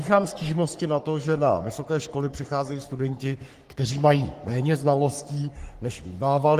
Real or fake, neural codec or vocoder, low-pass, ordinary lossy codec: fake; codec, 44.1 kHz, 3.4 kbps, Pupu-Codec; 14.4 kHz; Opus, 24 kbps